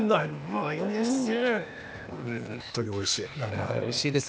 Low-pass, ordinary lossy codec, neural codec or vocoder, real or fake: none; none; codec, 16 kHz, 0.8 kbps, ZipCodec; fake